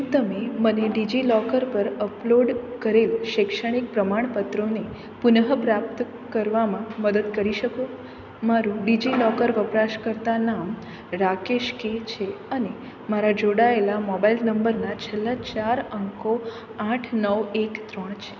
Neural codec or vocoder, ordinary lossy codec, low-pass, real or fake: none; none; 7.2 kHz; real